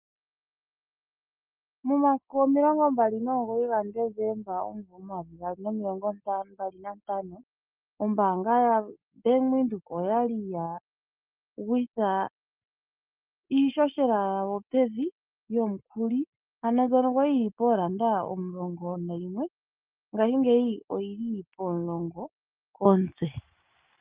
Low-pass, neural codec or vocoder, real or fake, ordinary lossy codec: 3.6 kHz; none; real; Opus, 24 kbps